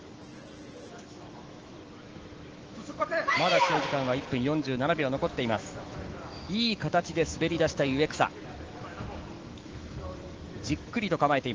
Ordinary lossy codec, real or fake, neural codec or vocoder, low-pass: Opus, 16 kbps; fake; autoencoder, 48 kHz, 128 numbers a frame, DAC-VAE, trained on Japanese speech; 7.2 kHz